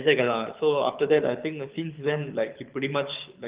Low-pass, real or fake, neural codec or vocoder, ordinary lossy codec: 3.6 kHz; fake; codec, 24 kHz, 6 kbps, HILCodec; Opus, 32 kbps